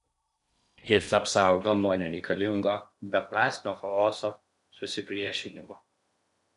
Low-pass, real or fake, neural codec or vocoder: 10.8 kHz; fake; codec, 16 kHz in and 24 kHz out, 0.8 kbps, FocalCodec, streaming, 65536 codes